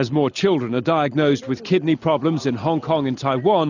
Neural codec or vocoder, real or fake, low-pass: none; real; 7.2 kHz